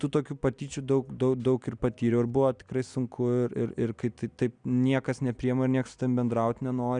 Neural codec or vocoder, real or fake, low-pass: none; real; 9.9 kHz